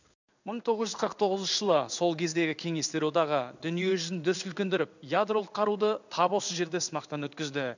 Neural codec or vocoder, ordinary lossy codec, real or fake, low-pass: codec, 16 kHz in and 24 kHz out, 1 kbps, XY-Tokenizer; none; fake; 7.2 kHz